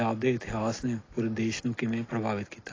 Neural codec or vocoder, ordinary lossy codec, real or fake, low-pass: none; AAC, 32 kbps; real; 7.2 kHz